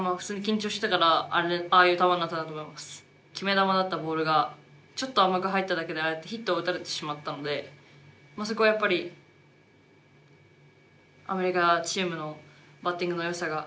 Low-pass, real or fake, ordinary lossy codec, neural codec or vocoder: none; real; none; none